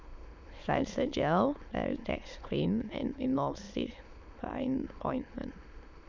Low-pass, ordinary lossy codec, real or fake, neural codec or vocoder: 7.2 kHz; MP3, 64 kbps; fake; autoencoder, 22.05 kHz, a latent of 192 numbers a frame, VITS, trained on many speakers